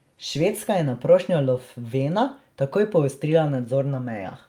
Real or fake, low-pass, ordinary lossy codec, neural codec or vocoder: real; 19.8 kHz; Opus, 32 kbps; none